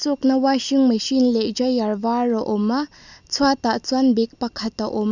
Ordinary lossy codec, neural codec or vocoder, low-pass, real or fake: none; none; 7.2 kHz; real